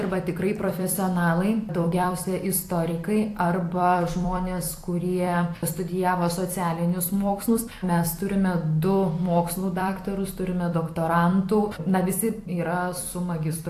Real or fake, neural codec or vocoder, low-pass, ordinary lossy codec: fake; vocoder, 44.1 kHz, 128 mel bands every 256 samples, BigVGAN v2; 14.4 kHz; AAC, 64 kbps